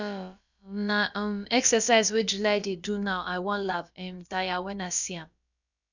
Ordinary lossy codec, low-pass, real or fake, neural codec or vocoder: none; 7.2 kHz; fake; codec, 16 kHz, about 1 kbps, DyCAST, with the encoder's durations